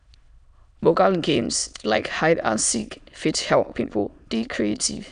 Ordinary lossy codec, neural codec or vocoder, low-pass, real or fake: none; autoencoder, 22.05 kHz, a latent of 192 numbers a frame, VITS, trained on many speakers; 9.9 kHz; fake